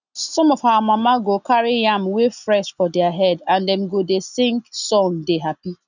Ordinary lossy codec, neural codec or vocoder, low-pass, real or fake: none; none; 7.2 kHz; real